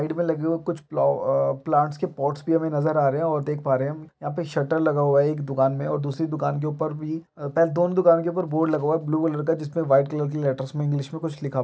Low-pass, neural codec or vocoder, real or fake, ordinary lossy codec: none; none; real; none